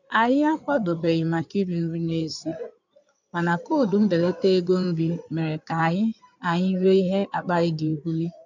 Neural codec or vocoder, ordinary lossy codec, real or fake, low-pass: codec, 16 kHz in and 24 kHz out, 2.2 kbps, FireRedTTS-2 codec; none; fake; 7.2 kHz